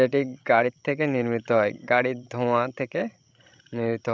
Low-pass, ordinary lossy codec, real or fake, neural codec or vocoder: none; none; fake; codec, 16 kHz, 16 kbps, FreqCodec, larger model